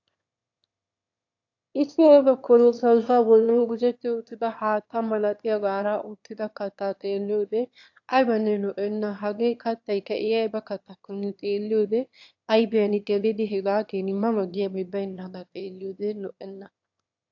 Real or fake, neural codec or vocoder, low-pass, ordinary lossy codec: fake; autoencoder, 22.05 kHz, a latent of 192 numbers a frame, VITS, trained on one speaker; 7.2 kHz; AAC, 48 kbps